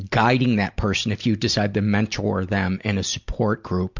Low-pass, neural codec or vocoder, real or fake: 7.2 kHz; none; real